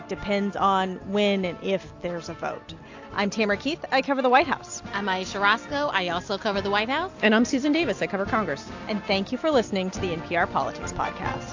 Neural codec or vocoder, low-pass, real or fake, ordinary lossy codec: none; 7.2 kHz; real; AAC, 48 kbps